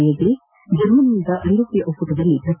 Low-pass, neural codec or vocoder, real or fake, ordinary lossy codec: 3.6 kHz; none; real; none